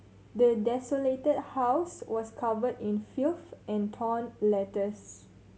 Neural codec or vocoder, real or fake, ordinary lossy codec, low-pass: none; real; none; none